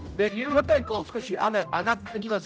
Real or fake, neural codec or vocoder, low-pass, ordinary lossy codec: fake; codec, 16 kHz, 0.5 kbps, X-Codec, HuBERT features, trained on general audio; none; none